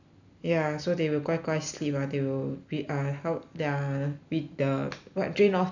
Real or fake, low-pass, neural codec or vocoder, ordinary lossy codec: real; 7.2 kHz; none; none